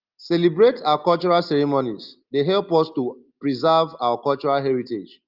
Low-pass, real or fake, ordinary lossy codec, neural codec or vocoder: 5.4 kHz; real; Opus, 24 kbps; none